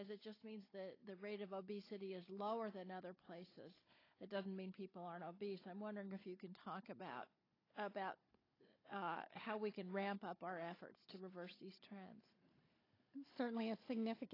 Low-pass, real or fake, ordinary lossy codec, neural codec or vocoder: 5.4 kHz; fake; AAC, 24 kbps; codec, 16 kHz, 16 kbps, FunCodec, trained on Chinese and English, 50 frames a second